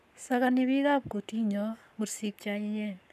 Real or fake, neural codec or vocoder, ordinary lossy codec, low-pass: fake; codec, 44.1 kHz, 7.8 kbps, Pupu-Codec; none; 14.4 kHz